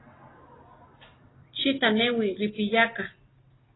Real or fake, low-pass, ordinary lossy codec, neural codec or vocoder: real; 7.2 kHz; AAC, 16 kbps; none